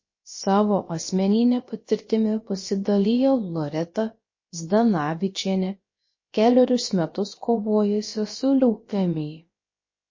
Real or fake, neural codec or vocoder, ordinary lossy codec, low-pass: fake; codec, 16 kHz, about 1 kbps, DyCAST, with the encoder's durations; MP3, 32 kbps; 7.2 kHz